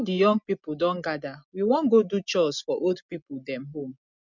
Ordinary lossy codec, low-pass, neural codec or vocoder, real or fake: none; 7.2 kHz; none; real